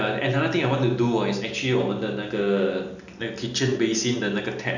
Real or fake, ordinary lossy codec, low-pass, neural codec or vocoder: real; none; 7.2 kHz; none